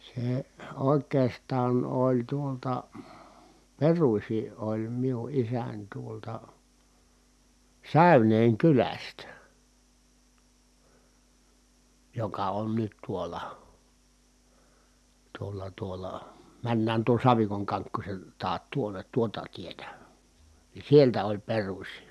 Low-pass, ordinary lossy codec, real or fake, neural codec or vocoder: none; none; real; none